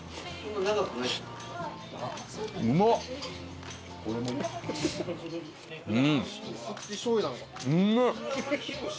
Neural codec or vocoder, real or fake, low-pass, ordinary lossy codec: none; real; none; none